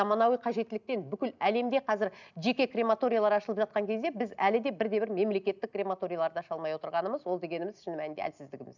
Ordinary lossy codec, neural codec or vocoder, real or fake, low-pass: none; none; real; 7.2 kHz